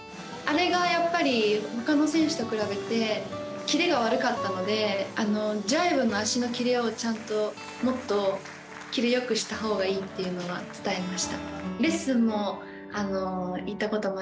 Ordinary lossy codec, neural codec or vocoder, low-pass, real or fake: none; none; none; real